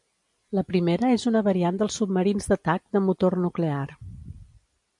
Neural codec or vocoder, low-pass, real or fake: none; 10.8 kHz; real